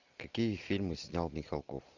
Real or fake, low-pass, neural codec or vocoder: real; 7.2 kHz; none